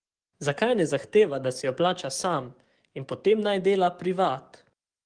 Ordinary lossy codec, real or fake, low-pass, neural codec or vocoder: Opus, 24 kbps; fake; 9.9 kHz; codec, 44.1 kHz, 7.8 kbps, DAC